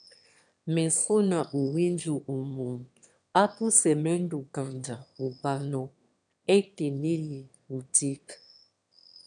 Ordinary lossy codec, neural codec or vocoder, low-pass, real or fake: MP3, 96 kbps; autoencoder, 22.05 kHz, a latent of 192 numbers a frame, VITS, trained on one speaker; 9.9 kHz; fake